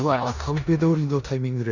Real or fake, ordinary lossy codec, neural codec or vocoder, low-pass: fake; none; codec, 16 kHz in and 24 kHz out, 0.9 kbps, LongCat-Audio-Codec, four codebook decoder; 7.2 kHz